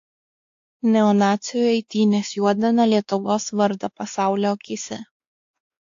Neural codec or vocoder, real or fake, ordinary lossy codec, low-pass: codec, 16 kHz, 2 kbps, X-Codec, WavLM features, trained on Multilingual LibriSpeech; fake; AAC, 48 kbps; 7.2 kHz